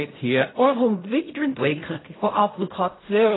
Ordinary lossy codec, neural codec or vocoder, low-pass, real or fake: AAC, 16 kbps; codec, 16 kHz in and 24 kHz out, 0.4 kbps, LongCat-Audio-Codec, fine tuned four codebook decoder; 7.2 kHz; fake